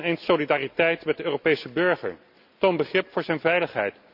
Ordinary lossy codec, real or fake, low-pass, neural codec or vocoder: none; real; 5.4 kHz; none